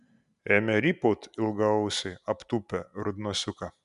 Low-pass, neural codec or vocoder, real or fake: 10.8 kHz; none; real